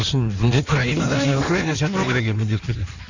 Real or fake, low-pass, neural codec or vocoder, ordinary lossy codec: fake; 7.2 kHz; codec, 16 kHz in and 24 kHz out, 1.1 kbps, FireRedTTS-2 codec; none